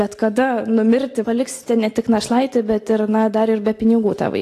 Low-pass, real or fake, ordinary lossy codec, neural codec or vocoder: 14.4 kHz; real; AAC, 48 kbps; none